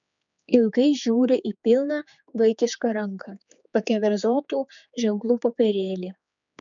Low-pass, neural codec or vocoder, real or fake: 7.2 kHz; codec, 16 kHz, 4 kbps, X-Codec, HuBERT features, trained on general audio; fake